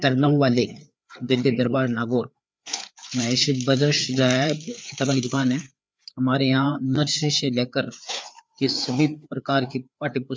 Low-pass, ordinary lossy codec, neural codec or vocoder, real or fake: none; none; codec, 16 kHz, 4 kbps, FreqCodec, larger model; fake